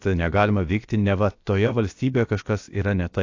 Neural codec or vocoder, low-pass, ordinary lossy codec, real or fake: codec, 16 kHz, about 1 kbps, DyCAST, with the encoder's durations; 7.2 kHz; AAC, 48 kbps; fake